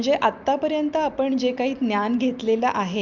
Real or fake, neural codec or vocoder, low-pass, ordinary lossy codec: real; none; 7.2 kHz; Opus, 24 kbps